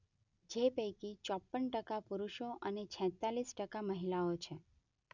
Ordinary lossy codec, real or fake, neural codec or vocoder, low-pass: none; real; none; 7.2 kHz